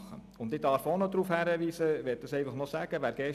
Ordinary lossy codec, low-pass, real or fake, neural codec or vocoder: none; 14.4 kHz; real; none